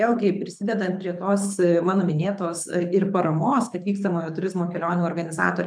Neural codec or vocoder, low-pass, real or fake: codec, 24 kHz, 6 kbps, HILCodec; 9.9 kHz; fake